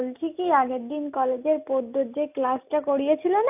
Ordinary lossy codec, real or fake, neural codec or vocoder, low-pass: AAC, 32 kbps; real; none; 3.6 kHz